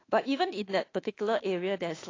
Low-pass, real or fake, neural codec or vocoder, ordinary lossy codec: 7.2 kHz; fake; autoencoder, 48 kHz, 32 numbers a frame, DAC-VAE, trained on Japanese speech; AAC, 32 kbps